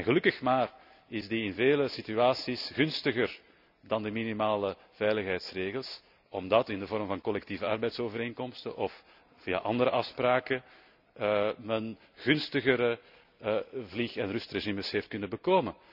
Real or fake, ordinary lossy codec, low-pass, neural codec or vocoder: real; none; 5.4 kHz; none